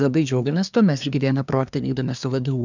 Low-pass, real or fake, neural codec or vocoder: 7.2 kHz; fake; codec, 24 kHz, 1 kbps, SNAC